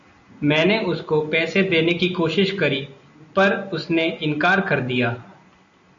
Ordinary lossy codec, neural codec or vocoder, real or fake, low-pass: MP3, 48 kbps; none; real; 7.2 kHz